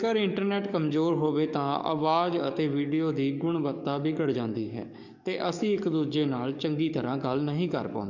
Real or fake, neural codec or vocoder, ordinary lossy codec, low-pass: fake; codec, 44.1 kHz, 7.8 kbps, DAC; none; 7.2 kHz